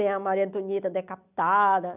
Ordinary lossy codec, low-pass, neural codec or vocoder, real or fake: none; 3.6 kHz; none; real